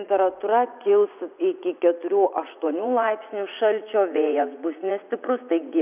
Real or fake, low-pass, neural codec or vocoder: fake; 3.6 kHz; vocoder, 44.1 kHz, 80 mel bands, Vocos